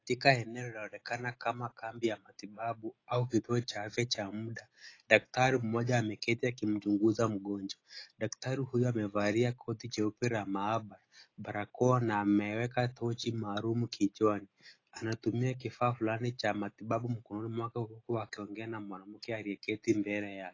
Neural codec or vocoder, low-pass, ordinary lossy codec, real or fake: none; 7.2 kHz; AAC, 32 kbps; real